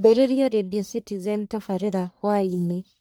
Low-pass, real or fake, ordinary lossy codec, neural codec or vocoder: none; fake; none; codec, 44.1 kHz, 1.7 kbps, Pupu-Codec